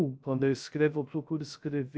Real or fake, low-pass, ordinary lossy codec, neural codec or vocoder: fake; none; none; codec, 16 kHz, 0.3 kbps, FocalCodec